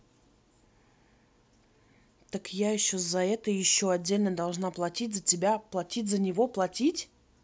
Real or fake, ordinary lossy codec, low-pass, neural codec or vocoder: real; none; none; none